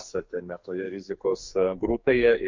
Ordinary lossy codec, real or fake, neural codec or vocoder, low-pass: MP3, 48 kbps; fake; codec, 44.1 kHz, 2.6 kbps, SNAC; 7.2 kHz